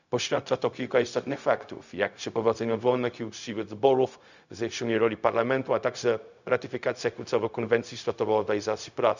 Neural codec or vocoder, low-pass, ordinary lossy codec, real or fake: codec, 16 kHz, 0.4 kbps, LongCat-Audio-Codec; 7.2 kHz; none; fake